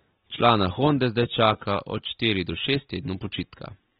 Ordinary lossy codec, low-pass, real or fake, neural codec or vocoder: AAC, 16 kbps; 9.9 kHz; real; none